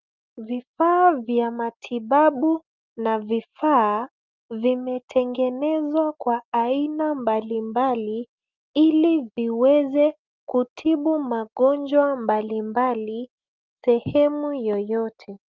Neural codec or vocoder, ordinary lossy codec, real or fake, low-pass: none; Opus, 32 kbps; real; 7.2 kHz